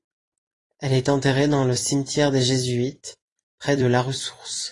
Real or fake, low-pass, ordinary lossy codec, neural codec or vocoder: fake; 10.8 kHz; AAC, 32 kbps; vocoder, 44.1 kHz, 128 mel bands every 256 samples, BigVGAN v2